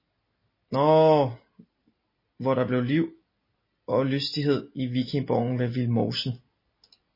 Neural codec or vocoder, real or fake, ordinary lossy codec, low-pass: none; real; MP3, 24 kbps; 5.4 kHz